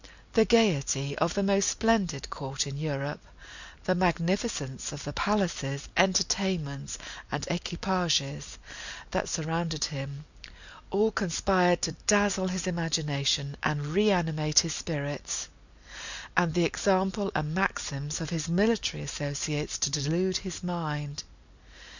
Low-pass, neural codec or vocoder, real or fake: 7.2 kHz; none; real